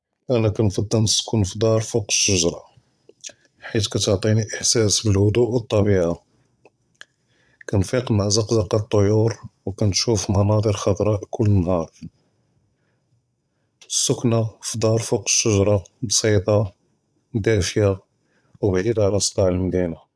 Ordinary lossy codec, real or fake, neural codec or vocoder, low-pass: none; fake; vocoder, 22.05 kHz, 80 mel bands, Vocos; none